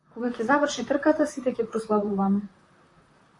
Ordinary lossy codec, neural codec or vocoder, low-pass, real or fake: AAC, 48 kbps; vocoder, 44.1 kHz, 128 mel bands, Pupu-Vocoder; 10.8 kHz; fake